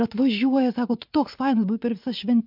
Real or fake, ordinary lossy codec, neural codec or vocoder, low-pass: fake; MP3, 48 kbps; vocoder, 44.1 kHz, 80 mel bands, Vocos; 5.4 kHz